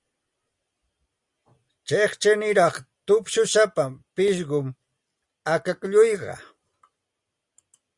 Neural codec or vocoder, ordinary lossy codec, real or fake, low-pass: none; Opus, 64 kbps; real; 10.8 kHz